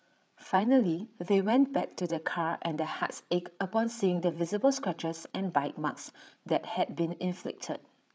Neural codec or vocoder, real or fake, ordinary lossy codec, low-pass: codec, 16 kHz, 8 kbps, FreqCodec, larger model; fake; none; none